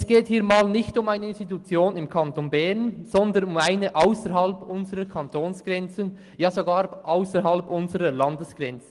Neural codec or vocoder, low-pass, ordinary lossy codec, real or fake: none; 10.8 kHz; Opus, 24 kbps; real